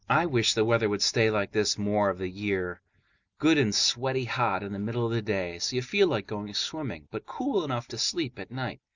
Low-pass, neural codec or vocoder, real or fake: 7.2 kHz; none; real